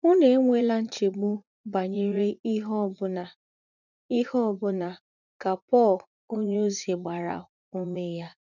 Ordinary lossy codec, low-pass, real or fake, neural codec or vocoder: none; 7.2 kHz; fake; vocoder, 44.1 kHz, 80 mel bands, Vocos